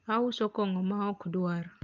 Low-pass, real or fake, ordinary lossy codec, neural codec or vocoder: 7.2 kHz; real; Opus, 24 kbps; none